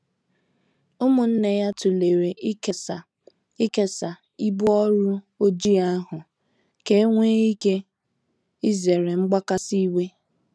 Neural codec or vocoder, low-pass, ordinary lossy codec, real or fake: none; none; none; real